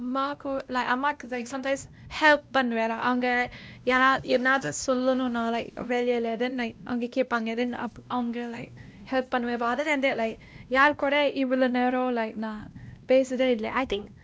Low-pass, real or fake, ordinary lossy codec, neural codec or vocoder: none; fake; none; codec, 16 kHz, 1 kbps, X-Codec, WavLM features, trained on Multilingual LibriSpeech